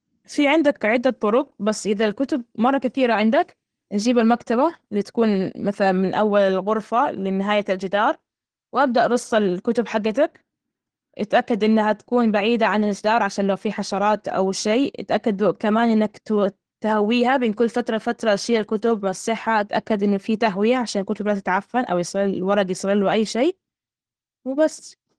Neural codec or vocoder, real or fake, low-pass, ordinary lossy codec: none; real; 9.9 kHz; Opus, 16 kbps